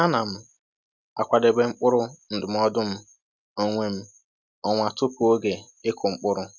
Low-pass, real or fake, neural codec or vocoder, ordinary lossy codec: 7.2 kHz; real; none; none